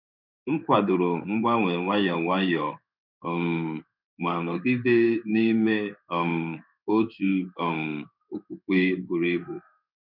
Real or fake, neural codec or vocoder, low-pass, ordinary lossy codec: fake; codec, 16 kHz in and 24 kHz out, 1 kbps, XY-Tokenizer; 5.4 kHz; none